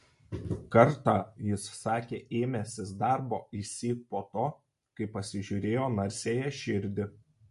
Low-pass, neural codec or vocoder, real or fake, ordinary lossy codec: 14.4 kHz; none; real; MP3, 48 kbps